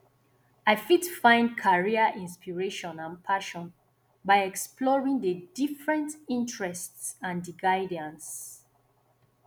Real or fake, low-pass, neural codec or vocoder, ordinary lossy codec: real; none; none; none